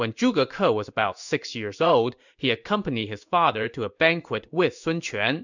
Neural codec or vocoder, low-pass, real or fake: codec, 16 kHz in and 24 kHz out, 1 kbps, XY-Tokenizer; 7.2 kHz; fake